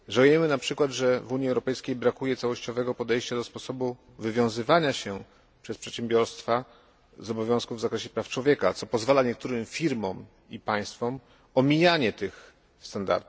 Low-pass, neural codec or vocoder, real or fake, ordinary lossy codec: none; none; real; none